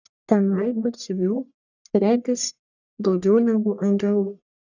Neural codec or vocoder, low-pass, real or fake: codec, 44.1 kHz, 1.7 kbps, Pupu-Codec; 7.2 kHz; fake